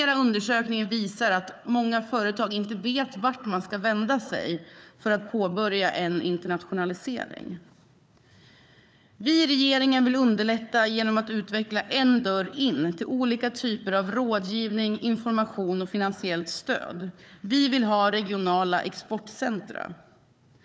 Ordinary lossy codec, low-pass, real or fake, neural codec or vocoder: none; none; fake; codec, 16 kHz, 4 kbps, FunCodec, trained on Chinese and English, 50 frames a second